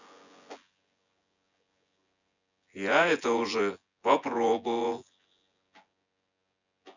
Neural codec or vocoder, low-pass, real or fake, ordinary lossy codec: vocoder, 24 kHz, 100 mel bands, Vocos; 7.2 kHz; fake; none